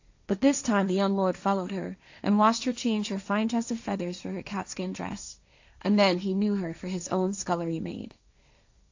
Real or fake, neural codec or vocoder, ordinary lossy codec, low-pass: fake; codec, 16 kHz, 1.1 kbps, Voila-Tokenizer; AAC, 48 kbps; 7.2 kHz